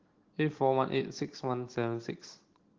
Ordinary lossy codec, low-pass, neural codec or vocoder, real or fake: Opus, 16 kbps; 7.2 kHz; none; real